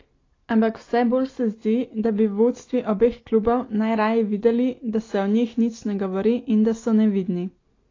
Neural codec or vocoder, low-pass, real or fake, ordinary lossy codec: none; 7.2 kHz; real; AAC, 32 kbps